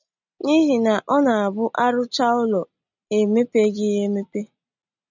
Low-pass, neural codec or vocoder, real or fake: 7.2 kHz; none; real